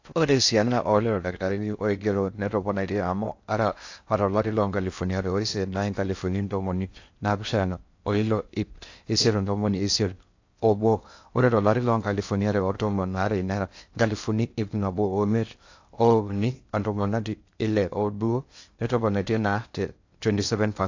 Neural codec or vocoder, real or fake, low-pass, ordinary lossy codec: codec, 16 kHz in and 24 kHz out, 0.6 kbps, FocalCodec, streaming, 4096 codes; fake; 7.2 kHz; AAC, 48 kbps